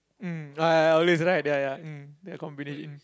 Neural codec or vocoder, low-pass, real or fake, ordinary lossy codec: none; none; real; none